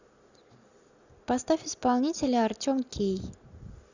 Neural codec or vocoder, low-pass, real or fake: none; 7.2 kHz; real